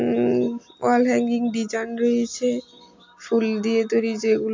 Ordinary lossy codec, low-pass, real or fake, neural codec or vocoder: MP3, 48 kbps; 7.2 kHz; real; none